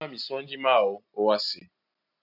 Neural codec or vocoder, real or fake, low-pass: none; real; 5.4 kHz